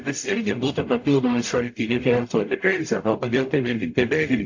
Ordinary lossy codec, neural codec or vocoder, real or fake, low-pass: AAC, 48 kbps; codec, 44.1 kHz, 0.9 kbps, DAC; fake; 7.2 kHz